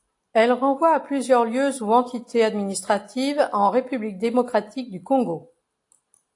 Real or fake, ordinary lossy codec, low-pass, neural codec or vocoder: real; AAC, 48 kbps; 10.8 kHz; none